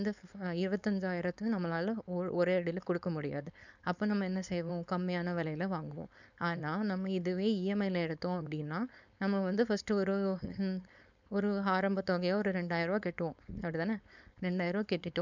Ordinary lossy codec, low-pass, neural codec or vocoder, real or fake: none; 7.2 kHz; codec, 16 kHz, 4.8 kbps, FACodec; fake